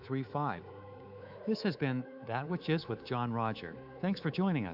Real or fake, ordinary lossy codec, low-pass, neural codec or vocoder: fake; AAC, 48 kbps; 5.4 kHz; codec, 24 kHz, 3.1 kbps, DualCodec